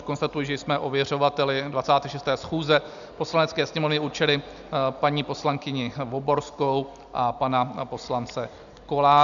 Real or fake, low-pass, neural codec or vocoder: real; 7.2 kHz; none